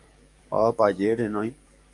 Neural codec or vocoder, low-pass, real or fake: codec, 44.1 kHz, 7.8 kbps, DAC; 10.8 kHz; fake